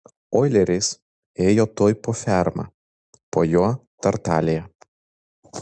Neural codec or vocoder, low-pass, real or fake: none; 9.9 kHz; real